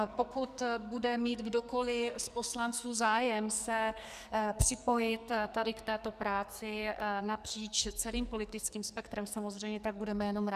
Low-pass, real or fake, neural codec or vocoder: 14.4 kHz; fake; codec, 44.1 kHz, 2.6 kbps, SNAC